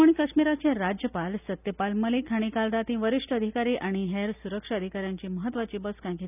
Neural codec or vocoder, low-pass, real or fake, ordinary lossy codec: none; 3.6 kHz; real; none